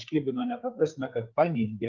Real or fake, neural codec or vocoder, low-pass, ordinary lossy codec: fake; codec, 16 kHz, 4 kbps, FreqCodec, larger model; 7.2 kHz; Opus, 32 kbps